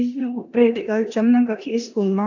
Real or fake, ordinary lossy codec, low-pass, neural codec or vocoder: fake; AAC, 48 kbps; 7.2 kHz; codec, 16 kHz in and 24 kHz out, 0.9 kbps, LongCat-Audio-Codec, four codebook decoder